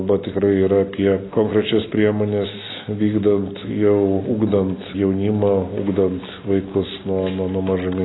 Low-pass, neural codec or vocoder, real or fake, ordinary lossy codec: 7.2 kHz; none; real; AAC, 16 kbps